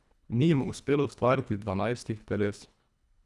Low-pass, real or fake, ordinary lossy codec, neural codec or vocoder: none; fake; none; codec, 24 kHz, 1.5 kbps, HILCodec